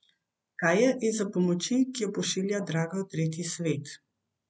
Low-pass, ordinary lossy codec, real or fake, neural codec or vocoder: none; none; real; none